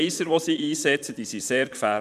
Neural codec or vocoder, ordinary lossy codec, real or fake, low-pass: vocoder, 44.1 kHz, 128 mel bands, Pupu-Vocoder; none; fake; 14.4 kHz